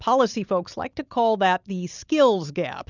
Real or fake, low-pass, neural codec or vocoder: real; 7.2 kHz; none